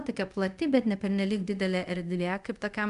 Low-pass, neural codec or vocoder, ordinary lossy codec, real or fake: 10.8 kHz; codec, 24 kHz, 0.5 kbps, DualCodec; AAC, 96 kbps; fake